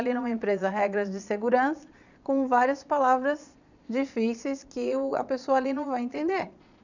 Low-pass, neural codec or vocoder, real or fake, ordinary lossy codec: 7.2 kHz; vocoder, 22.05 kHz, 80 mel bands, Vocos; fake; none